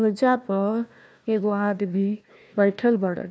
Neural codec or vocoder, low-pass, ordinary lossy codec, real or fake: codec, 16 kHz, 1 kbps, FunCodec, trained on Chinese and English, 50 frames a second; none; none; fake